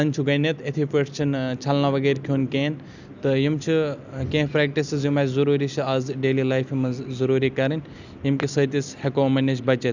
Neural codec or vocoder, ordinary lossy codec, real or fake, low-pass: none; none; real; 7.2 kHz